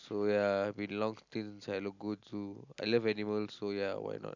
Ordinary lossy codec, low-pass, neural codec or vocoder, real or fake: none; 7.2 kHz; none; real